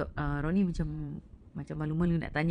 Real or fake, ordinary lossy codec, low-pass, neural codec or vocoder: fake; none; 9.9 kHz; vocoder, 22.05 kHz, 80 mel bands, Vocos